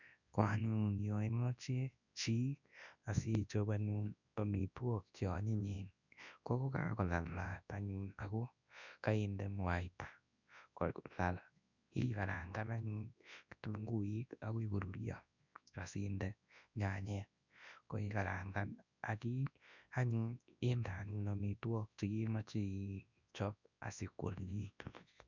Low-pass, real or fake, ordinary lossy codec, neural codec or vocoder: 7.2 kHz; fake; none; codec, 24 kHz, 0.9 kbps, WavTokenizer, large speech release